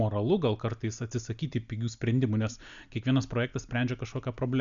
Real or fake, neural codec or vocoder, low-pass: real; none; 7.2 kHz